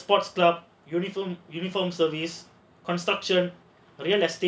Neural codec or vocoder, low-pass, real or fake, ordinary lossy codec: none; none; real; none